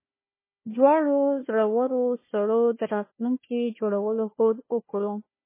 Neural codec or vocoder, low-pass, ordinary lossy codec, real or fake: codec, 16 kHz, 1 kbps, FunCodec, trained on Chinese and English, 50 frames a second; 3.6 kHz; MP3, 16 kbps; fake